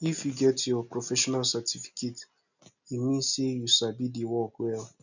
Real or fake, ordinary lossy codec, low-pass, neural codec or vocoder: real; none; 7.2 kHz; none